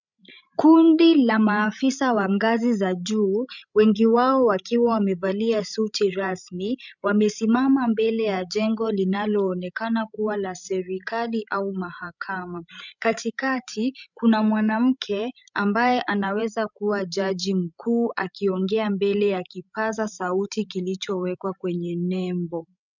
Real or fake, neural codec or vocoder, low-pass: fake; codec, 16 kHz, 16 kbps, FreqCodec, larger model; 7.2 kHz